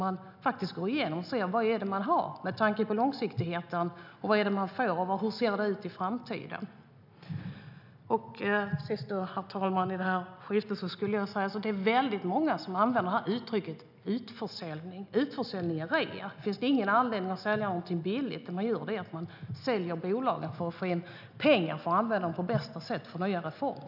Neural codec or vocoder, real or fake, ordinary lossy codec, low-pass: none; real; none; 5.4 kHz